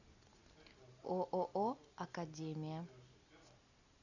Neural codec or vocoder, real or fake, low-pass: none; real; 7.2 kHz